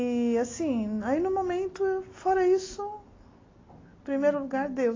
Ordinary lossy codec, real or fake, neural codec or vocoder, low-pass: AAC, 32 kbps; real; none; 7.2 kHz